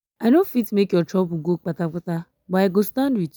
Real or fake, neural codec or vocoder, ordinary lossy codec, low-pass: real; none; none; none